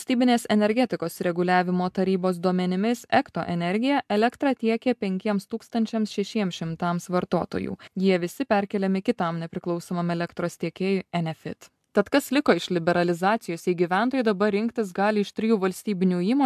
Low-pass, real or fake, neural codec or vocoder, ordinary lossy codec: 14.4 kHz; real; none; AAC, 96 kbps